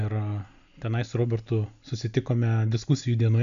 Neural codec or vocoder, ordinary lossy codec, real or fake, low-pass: none; AAC, 96 kbps; real; 7.2 kHz